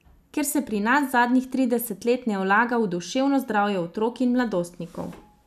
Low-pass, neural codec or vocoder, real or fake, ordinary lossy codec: 14.4 kHz; none; real; none